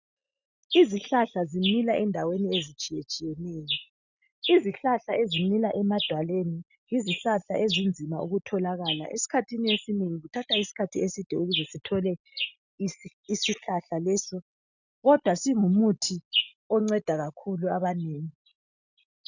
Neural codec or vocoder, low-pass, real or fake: none; 7.2 kHz; real